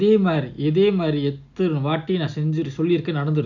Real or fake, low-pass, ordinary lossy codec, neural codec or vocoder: real; 7.2 kHz; none; none